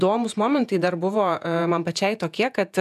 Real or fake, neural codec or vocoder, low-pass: fake; vocoder, 48 kHz, 128 mel bands, Vocos; 14.4 kHz